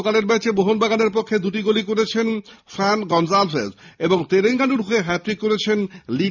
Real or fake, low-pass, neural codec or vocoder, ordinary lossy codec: real; 7.2 kHz; none; none